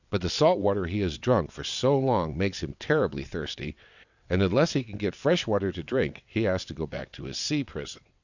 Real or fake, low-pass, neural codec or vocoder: fake; 7.2 kHz; vocoder, 22.05 kHz, 80 mel bands, WaveNeXt